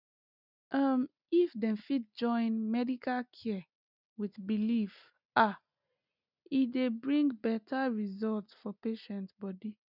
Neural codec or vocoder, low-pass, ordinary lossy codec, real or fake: none; 5.4 kHz; none; real